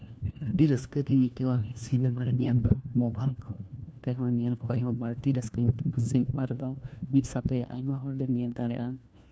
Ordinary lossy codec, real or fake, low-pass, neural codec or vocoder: none; fake; none; codec, 16 kHz, 1 kbps, FunCodec, trained on LibriTTS, 50 frames a second